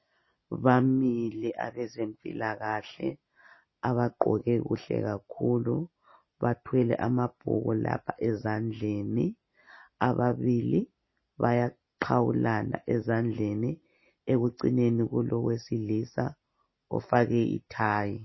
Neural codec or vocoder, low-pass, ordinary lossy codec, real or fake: none; 7.2 kHz; MP3, 24 kbps; real